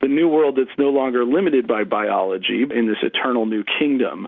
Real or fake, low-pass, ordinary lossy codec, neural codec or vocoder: real; 7.2 kHz; Opus, 64 kbps; none